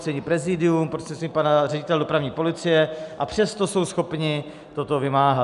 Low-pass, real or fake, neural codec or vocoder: 10.8 kHz; real; none